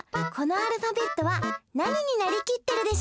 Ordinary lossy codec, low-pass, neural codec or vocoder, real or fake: none; none; none; real